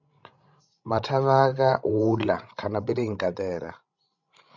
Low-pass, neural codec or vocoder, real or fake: 7.2 kHz; codec, 16 kHz, 16 kbps, FreqCodec, larger model; fake